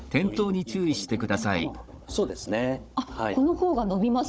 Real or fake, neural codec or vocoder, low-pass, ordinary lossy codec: fake; codec, 16 kHz, 16 kbps, FunCodec, trained on Chinese and English, 50 frames a second; none; none